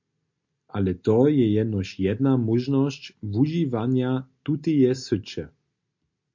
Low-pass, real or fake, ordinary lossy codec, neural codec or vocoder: 7.2 kHz; real; MP3, 48 kbps; none